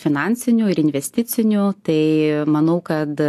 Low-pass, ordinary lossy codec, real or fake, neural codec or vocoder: 14.4 kHz; AAC, 64 kbps; real; none